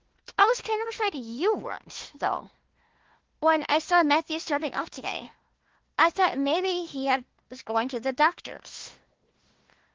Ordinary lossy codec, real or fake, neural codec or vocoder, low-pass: Opus, 16 kbps; fake; codec, 16 kHz, 1 kbps, FunCodec, trained on Chinese and English, 50 frames a second; 7.2 kHz